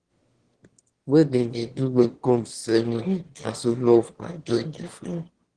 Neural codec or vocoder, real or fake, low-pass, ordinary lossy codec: autoencoder, 22.05 kHz, a latent of 192 numbers a frame, VITS, trained on one speaker; fake; 9.9 kHz; Opus, 16 kbps